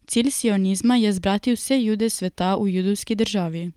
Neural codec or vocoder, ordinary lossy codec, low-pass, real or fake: none; Opus, 32 kbps; 19.8 kHz; real